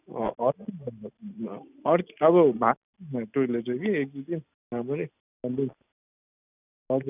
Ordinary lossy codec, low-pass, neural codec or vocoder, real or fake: none; 3.6 kHz; none; real